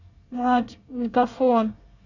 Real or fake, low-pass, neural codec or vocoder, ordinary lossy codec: fake; 7.2 kHz; codec, 24 kHz, 1 kbps, SNAC; none